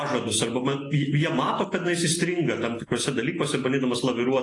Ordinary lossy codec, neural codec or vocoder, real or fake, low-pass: AAC, 32 kbps; none; real; 10.8 kHz